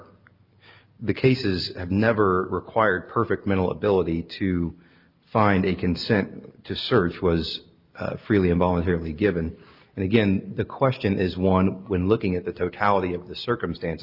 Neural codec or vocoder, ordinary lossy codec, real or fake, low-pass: none; Opus, 24 kbps; real; 5.4 kHz